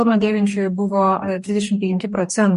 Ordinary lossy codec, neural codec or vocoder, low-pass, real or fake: MP3, 48 kbps; codec, 44.1 kHz, 2.6 kbps, DAC; 14.4 kHz; fake